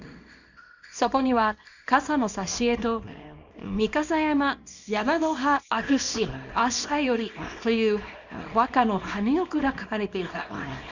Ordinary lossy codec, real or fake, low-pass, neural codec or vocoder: none; fake; 7.2 kHz; codec, 24 kHz, 0.9 kbps, WavTokenizer, small release